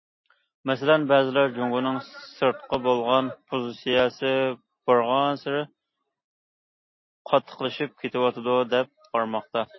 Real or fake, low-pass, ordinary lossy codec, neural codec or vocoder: real; 7.2 kHz; MP3, 24 kbps; none